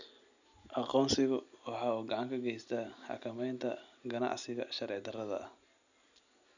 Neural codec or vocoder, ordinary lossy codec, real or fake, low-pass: none; none; real; 7.2 kHz